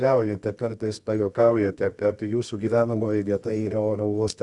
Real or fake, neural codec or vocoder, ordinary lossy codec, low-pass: fake; codec, 24 kHz, 0.9 kbps, WavTokenizer, medium music audio release; Opus, 64 kbps; 10.8 kHz